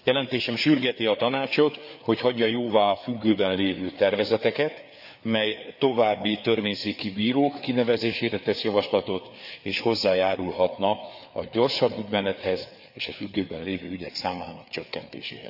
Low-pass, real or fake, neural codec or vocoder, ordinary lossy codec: 5.4 kHz; fake; codec, 16 kHz, 4 kbps, FreqCodec, larger model; none